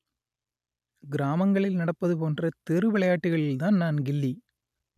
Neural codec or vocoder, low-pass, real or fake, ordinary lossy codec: none; 14.4 kHz; real; none